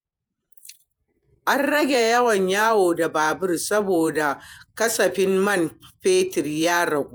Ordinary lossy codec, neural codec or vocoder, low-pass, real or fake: none; vocoder, 48 kHz, 128 mel bands, Vocos; none; fake